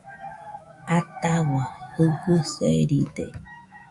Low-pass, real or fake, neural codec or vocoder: 10.8 kHz; fake; autoencoder, 48 kHz, 128 numbers a frame, DAC-VAE, trained on Japanese speech